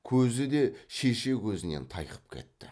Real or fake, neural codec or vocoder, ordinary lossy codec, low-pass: real; none; none; none